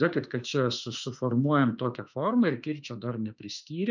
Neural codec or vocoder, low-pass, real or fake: autoencoder, 48 kHz, 32 numbers a frame, DAC-VAE, trained on Japanese speech; 7.2 kHz; fake